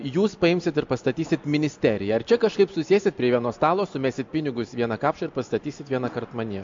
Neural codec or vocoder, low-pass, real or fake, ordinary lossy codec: none; 7.2 kHz; real; MP3, 48 kbps